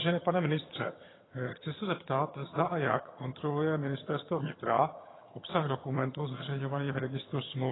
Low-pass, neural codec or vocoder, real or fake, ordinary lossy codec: 7.2 kHz; vocoder, 22.05 kHz, 80 mel bands, HiFi-GAN; fake; AAC, 16 kbps